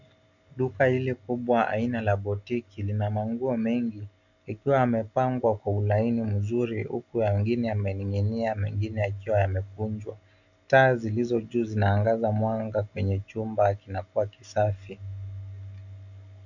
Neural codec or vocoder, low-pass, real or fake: none; 7.2 kHz; real